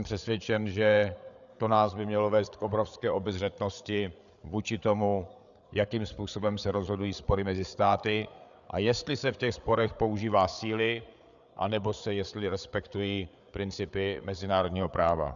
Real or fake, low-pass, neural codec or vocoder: fake; 7.2 kHz; codec, 16 kHz, 8 kbps, FreqCodec, larger model